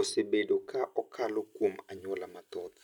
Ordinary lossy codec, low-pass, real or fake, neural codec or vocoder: none; 19.8 kHz; real; none